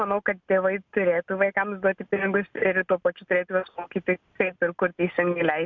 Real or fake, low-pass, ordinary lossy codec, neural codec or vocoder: real; 7.2 kHz; MP3, 64 kbps; none